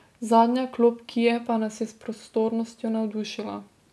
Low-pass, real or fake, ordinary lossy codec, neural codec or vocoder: none; real; none; none